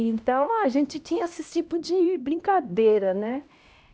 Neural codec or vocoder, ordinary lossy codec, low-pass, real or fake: codec, 16 kHz, 1 kbps, X-Codec, HuBERT features, trained on LibriSpeech; none; none; fake